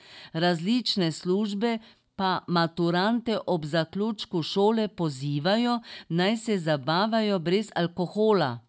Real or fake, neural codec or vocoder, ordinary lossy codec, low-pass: real; none; none; none